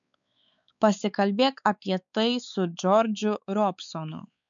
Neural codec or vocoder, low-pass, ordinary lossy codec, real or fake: codec, 16 kHz, 4 kbps, X-Codec, WavLM features, trained on Multilingual LibriSpeech; 7.2 kHz; MP3, 96 kbps; fake